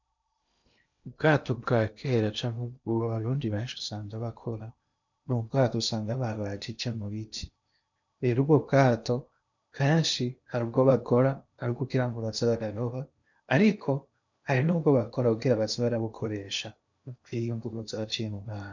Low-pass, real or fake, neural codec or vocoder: 7.2 kHz; fake; codec, 16 kHz in and 24 kHz out, 0.8 kbps, FocalCodec, streaming, 65536 codes